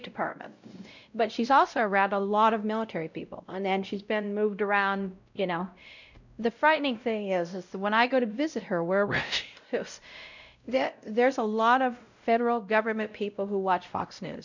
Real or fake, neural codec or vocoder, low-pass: fake; codec, 16 kHz, 0.5 kbps, X-Codec, WavLM features, trained on Multilingual LibriSpeech; 7.2 kHz